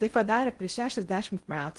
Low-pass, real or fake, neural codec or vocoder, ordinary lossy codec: 10.8 kHz; fake; codec, 16 kHz in and 24 kHz out, 0.6 kbps, FocalCodec, streaming, 2048 codes; Opus, 24 kbps